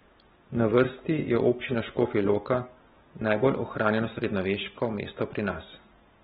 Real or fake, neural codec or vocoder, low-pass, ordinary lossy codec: real; none; 7.2 kHz; AAC, 16 kbps